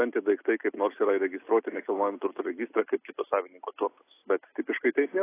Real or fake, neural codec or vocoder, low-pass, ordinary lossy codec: real; none; 3.6 kHz; AAC, 24 kbps